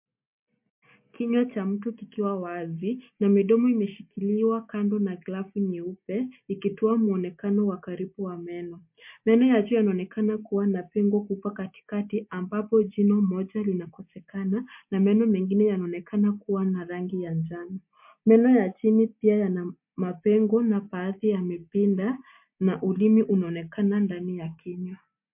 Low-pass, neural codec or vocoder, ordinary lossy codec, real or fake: 3.6 kHz; none; MP3, 32 kbps; real